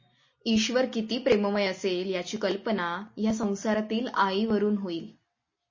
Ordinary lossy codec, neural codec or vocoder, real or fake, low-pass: MP3, 32 kbps; none; real; 7.2 kHz